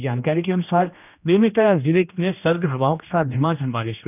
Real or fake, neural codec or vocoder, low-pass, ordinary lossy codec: fake; codec, 16 kHz, 1 kbps, X-Codec, HuBERT features, trained on general audio; 3.6 kHz; none